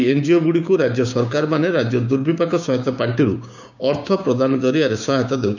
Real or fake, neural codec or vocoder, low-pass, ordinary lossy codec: fake; codec, 16 kHz, 6 kbps, DAC; 7.2 kHz; none